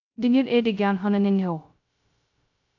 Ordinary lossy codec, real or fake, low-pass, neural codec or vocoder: AAC, 48 kbps; fake; 7.2 kHz; codec, 16 kHz, 0.2 kbps, FocalCodec